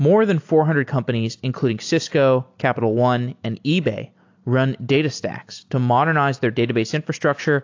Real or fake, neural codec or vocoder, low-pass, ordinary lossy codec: real; none; 7.2 kHz; AAC, 48 kbps